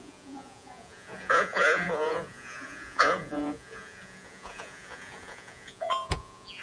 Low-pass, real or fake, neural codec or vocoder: 9.9 kHz; fake; vocoder, 48 kHz, 128 mel bands, Vocos